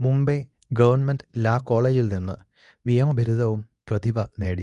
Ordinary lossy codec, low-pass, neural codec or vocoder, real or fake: none; 10.8 kHz; codec, 24 kHz, 0.9 kbps, WavTokenizer, medium speech release version 2; fake